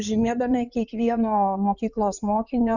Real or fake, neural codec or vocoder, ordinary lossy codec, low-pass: fake; codec, 16 kHz in and 24 kHz out, 2.2 kbps, FireRedTTS-2 codec; Opus, 64 kbps; 7.2 kHz